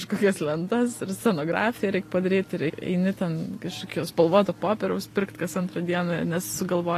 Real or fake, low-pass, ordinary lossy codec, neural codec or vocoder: real; 14.4 kHz; AAC, 48 kbps; none